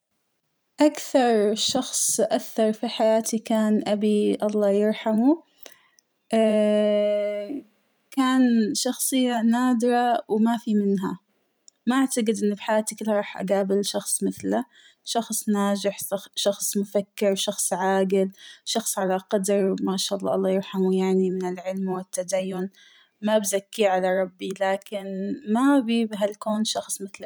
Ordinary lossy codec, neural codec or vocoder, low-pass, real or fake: none; vocoder, 44.1 kHz, 128 mel bands every 512 samples, BigVGAN v2; none; fake